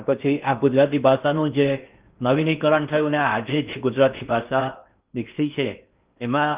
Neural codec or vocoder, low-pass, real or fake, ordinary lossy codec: codec, 16 kHz in and 24 kHz out, 0.6 kbps, FocalCodec, streaming, 2048 codes; 3.6 kHz; fake; Opus, 24 kbps